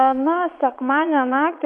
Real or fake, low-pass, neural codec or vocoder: fake; 9.9 kHz; vocoder, 22.05 kHz, 80 mel bands, Vocos